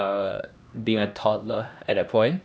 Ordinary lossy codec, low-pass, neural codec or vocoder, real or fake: none; none; codec, 16 kHz, 1 kbps, X-Codec, HuBERT features, trained on LibriSpeech; fake